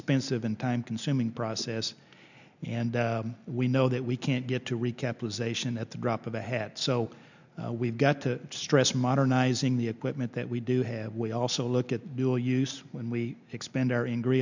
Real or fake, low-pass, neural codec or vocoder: real; 7.2 kHz; none